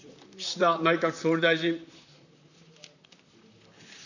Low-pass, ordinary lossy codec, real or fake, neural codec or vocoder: 7.2 kHz; none; fake; vocoder, 44.1 kHz, 128 mel bands, Pupu-Vocoder